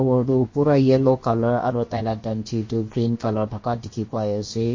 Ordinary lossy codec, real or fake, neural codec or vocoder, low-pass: MP3, 32 kbps; fake; codec, 16 kHz, about 1 kbps, DyCAST, with the encoder's durations; 7.2 kHz